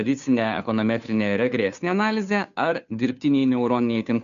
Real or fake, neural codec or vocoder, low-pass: fake; codec, 16 kHz, 2 kbps, FunCodec, trained on Chinese and English, 25 frames a second; 7.2 kHz